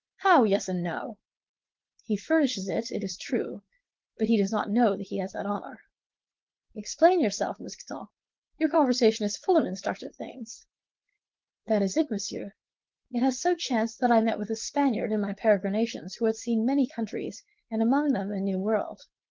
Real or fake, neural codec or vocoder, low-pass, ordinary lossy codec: fake; codec, 16 kHz, 4.8 kbps, FACodec; 7.2 kHz; Opus, 16 kbps